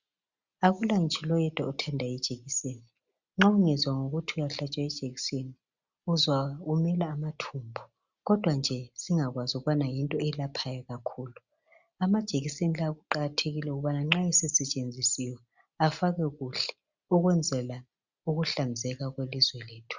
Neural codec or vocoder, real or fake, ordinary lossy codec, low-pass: none; real; Opus, 64 kbps; 7.2 kHz